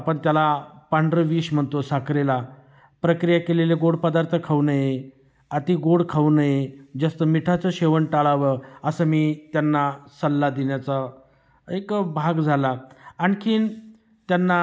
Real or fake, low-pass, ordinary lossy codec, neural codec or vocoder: real; none; none; none